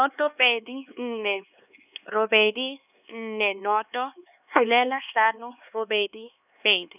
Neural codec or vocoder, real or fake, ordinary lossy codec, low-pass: codec, 16 kHz, 2 kbps, X-Codec, HuBERT features, trained on LibriSpeech; fake; none; 3.6 kHz